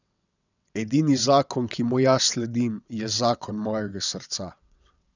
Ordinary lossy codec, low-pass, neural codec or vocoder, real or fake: none; 7.2 kHz; vocoder, 22.05 kHz, 80 mel bands, WaveNeXt; fake